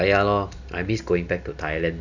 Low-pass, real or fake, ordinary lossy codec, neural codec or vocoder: 7.2 kHz; real; none; none